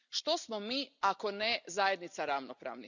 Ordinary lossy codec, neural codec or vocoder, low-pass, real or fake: none; none; 7.2 kHz; real